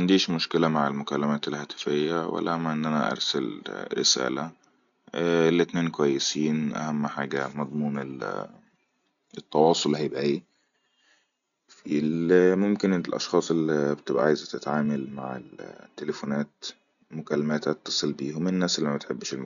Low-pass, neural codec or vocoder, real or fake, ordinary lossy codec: 7.2 kHz; none; real; none